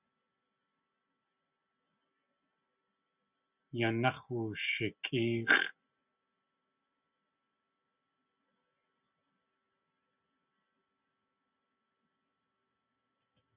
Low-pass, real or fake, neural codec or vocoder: 3.6 kHz; real; none